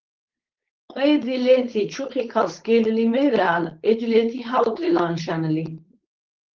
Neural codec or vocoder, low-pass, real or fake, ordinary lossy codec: codec, 16 kHz, 4.8 kbps, FACodec; 7.2 kHz; fake; Opus, 16 kbps